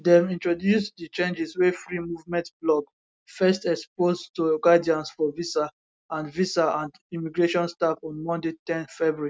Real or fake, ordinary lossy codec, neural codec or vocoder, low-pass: real; none; none; none